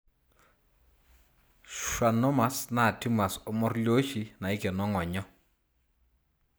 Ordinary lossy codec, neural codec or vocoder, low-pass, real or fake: none; none; none; real